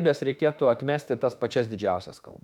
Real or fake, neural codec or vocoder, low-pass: fake; autoencoder, 48 kHz, 32 numbers a frame, DAC-VAE, trained on Japanese speech; 19.8 kHz